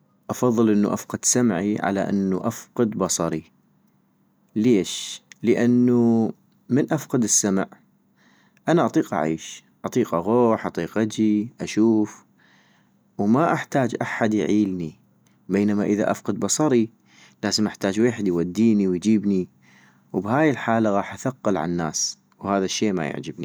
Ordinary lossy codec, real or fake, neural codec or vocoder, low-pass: none; real; none; none